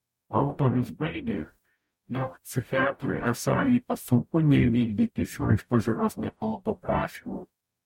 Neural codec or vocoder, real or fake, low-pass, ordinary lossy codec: codec, 44.1 kHz, 0.9 kbps, DAC; fake; 19.8 kHz; MP3, 64 kbps